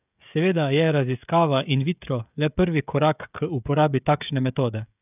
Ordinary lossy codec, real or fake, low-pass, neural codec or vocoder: none; fake; 3.6 kHz; codec, 16 kHz, 16 kbps, FreqCodec, smaller model